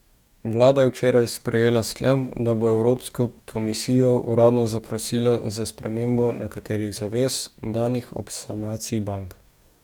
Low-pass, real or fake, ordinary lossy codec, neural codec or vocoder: 19.8 kHz; fake; none; codec, 44.1 kHz, 2.6 kbps, DAC